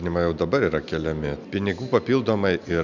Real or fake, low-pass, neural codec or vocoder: real; 7.2 kHz; none